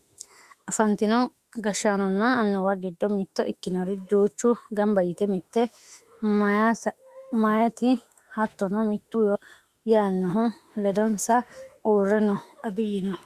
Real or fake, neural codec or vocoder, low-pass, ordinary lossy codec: fake; autoencoder, 48 kHz, 32 numbers a frame, DAC-VAE, trained on Japanese speech; 14.4 kHz; Opus, 64 kbps